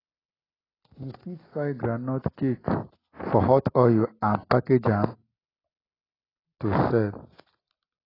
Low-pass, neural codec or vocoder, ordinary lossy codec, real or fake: 5.4 kHz; none; AAC, 24 kbps; real